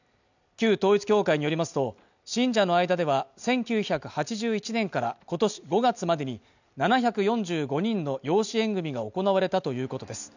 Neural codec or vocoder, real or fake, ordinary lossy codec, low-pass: none; real; none; 7.2 kHz